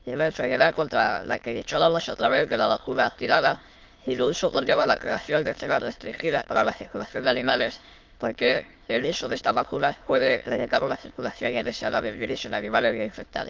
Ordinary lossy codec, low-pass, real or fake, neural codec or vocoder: Opus, 32 kbps; 7.2 kHz; fake; autoencoder, 22.05 kHz, a latent of 192 numbers a frame, VITS, trained on many speakers